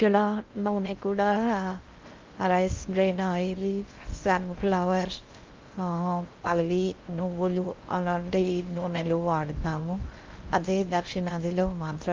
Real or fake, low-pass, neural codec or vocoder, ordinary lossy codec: fake; 7.2 kHz; codec, 16 kHz in and 24 kHz out, 0.6 kbps, FocalCodec, streaming, 2048 codes; Opus, 24 kbps